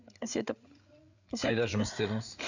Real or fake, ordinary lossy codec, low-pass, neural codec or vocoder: fake; none; 7.2 kHz; codec, 16 kHz, 8 kbps, FreqCodec, larger model